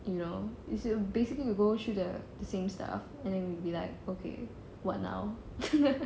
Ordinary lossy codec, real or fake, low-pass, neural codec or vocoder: none; real; none; none